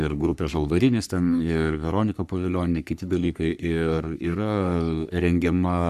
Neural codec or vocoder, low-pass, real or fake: codec, 44.1 kHz, 3.4 kbps, Pupu-Codec; 14.4 kHz; fake